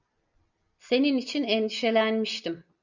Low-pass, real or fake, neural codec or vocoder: 7.2 kHz; real; none